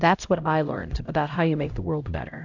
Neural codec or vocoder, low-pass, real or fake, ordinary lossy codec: codec, 16 kHz, 0.5 kbps, X-Codec, HuBERT features, trained on LibriSpeech; 7.2 kHz; fake; AAC, 48 kbps